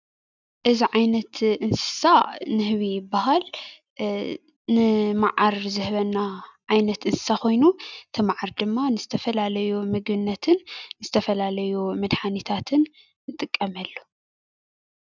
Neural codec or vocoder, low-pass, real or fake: none; 7.2 kHz; real